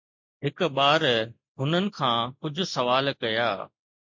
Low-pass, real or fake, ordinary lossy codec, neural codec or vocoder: 7.2 kHz; real; MP3, 48 kbps; none